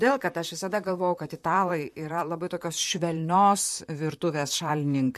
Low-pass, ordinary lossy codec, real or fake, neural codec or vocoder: 14.4 kHz; MP3, 64 kbps; fake; vocoder, 44.1 kHz, 128 mel bands, Pupu-Vocoder